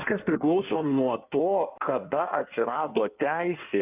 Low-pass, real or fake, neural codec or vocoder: 3.6 kHz; fake; codec, 16 kHz in and 24 kHz out, 1.1 kbps, FireRedTTS-2 codec